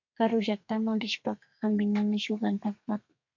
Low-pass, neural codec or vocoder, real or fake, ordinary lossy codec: 7.2 kHz; codec, 32 kHz, 1.9 kbps, SNAC; fake; MP3, 64 kbps